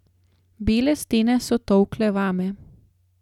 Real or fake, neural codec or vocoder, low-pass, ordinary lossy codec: real; none; 19.8 kHz; none